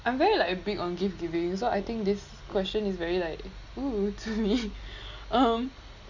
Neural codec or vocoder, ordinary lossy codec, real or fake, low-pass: none; Opus, 64 kbps; real; 7.2 kHz